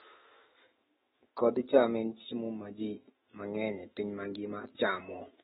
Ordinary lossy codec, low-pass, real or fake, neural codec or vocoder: AAC, 16 kbps; 19.8 kHz; fake; autoencoder, 48 kHz, 128 numbers a frame, DAC-VAE, trained on Japanese speech